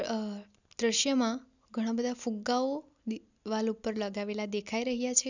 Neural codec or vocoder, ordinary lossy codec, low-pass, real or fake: none; none; 7.2 kHz; real